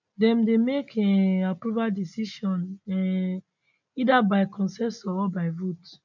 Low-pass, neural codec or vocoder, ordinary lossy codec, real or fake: 7.2 kHz; none; none; real